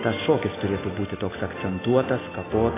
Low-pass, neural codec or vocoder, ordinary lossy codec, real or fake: 3.6 kHz; none; AAC, 16 kbps; real